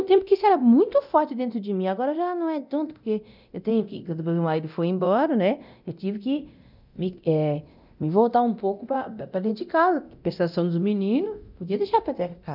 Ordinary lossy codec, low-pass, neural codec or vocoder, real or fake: none; 5.4 kHz; codec, 24 kHz, 0.9 kbps, DualCodec; fake